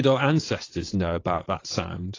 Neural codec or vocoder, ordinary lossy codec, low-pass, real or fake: vocoder, 22.05 kHz, 80 mel bands, WaveNeXt; AAC, 32 kbps; 7.2 kHz; fake